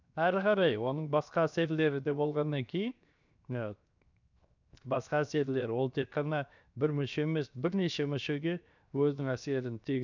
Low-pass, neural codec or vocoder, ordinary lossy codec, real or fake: 7.2 kHz; codec, 16 kHz, 0.7 kbps, FocalCodec; none; fake